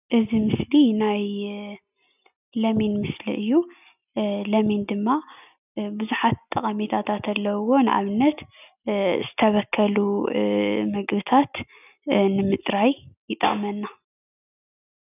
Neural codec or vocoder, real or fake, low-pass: none; real; 3.6 kHz